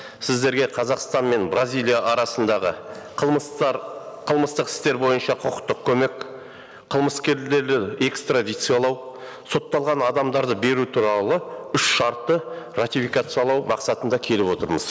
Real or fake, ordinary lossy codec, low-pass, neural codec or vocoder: real; none; none; none